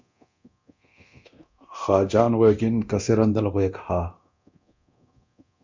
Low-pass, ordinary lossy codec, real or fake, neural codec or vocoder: 7.2 kHz; MP3, 64 kbps; fake; codec, 24 kHz, 0.9 kbps, DualCodec